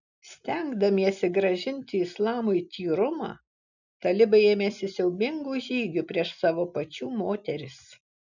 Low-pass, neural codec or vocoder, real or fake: 7.2 kHz; none; real